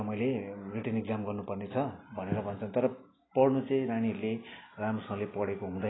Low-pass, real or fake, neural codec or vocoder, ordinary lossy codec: 7.2 kHz; real; none; AAC, 16 kbps